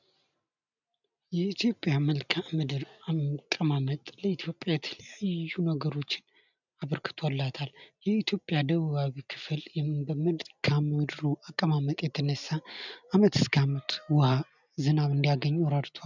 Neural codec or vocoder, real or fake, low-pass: none; real; 7.2 kHz